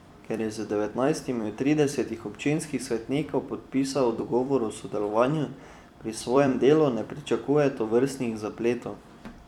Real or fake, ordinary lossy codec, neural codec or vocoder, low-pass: fake; none; vocoder, 44.1 kHz, 128 mel bands every 256 samples, BigVGAN v2; 19.8 kHz